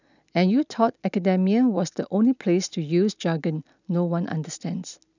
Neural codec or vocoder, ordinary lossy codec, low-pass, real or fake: none; none; 7.2 kHz; real